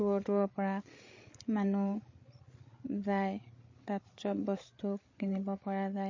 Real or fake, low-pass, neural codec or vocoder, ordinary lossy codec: fake; 7.2 kHz; codec, 16 kHz, 8 kbps, FreqCodec, larger model; MP3, 32 kbps